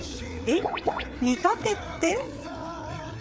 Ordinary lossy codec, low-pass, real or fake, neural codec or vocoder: none; none; fake; codec, 16 kHz, 4 kbps, FreqCodec, larger model